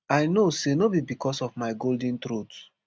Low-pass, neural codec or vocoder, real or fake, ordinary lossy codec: none; none; real; none